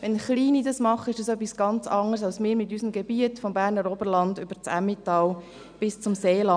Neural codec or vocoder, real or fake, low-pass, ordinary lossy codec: none; real; 9.9 kHz; none